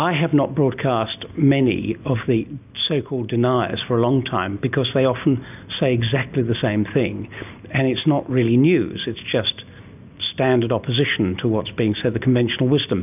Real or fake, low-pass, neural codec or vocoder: real; 3.6 kHz; none